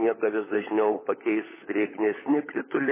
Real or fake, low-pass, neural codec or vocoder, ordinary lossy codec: fake; 3.6 kHz; codec, 16 kHz, 16 kbps, FunCodec, trained on LibriTTS, 50 frames a second; MP3, 16 kbps